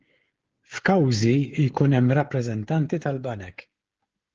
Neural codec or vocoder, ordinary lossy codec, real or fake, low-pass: codec, 16 kHz, 16 kbps, FreqCodec, smaller model; Opus, 16 kbps; fake; 7.2 kHz